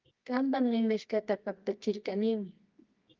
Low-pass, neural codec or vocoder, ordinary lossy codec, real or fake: 7.2 kHz; codec, 24 kHz, 0.9 kbps, WavTokenizer, medium music audio release; Opus, 32 kbps; fake